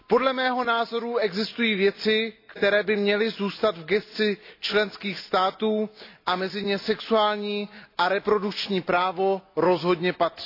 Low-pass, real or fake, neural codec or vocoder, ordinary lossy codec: 5.4 kHz; real; none; AAC, 32 kbps